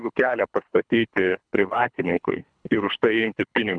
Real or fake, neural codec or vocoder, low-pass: fake; codec, 24 kHz, 3 kbps, HILCodec; 9.9 kHz